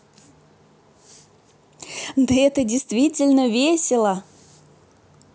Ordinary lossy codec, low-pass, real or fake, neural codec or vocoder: none; none; real; none